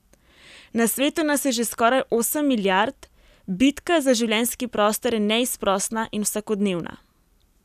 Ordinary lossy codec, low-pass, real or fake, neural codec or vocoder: none; 14.4 kHz; real; none